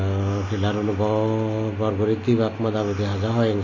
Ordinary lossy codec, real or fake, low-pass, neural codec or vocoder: MP3, 32 kbps; real; 7.2 kHz; none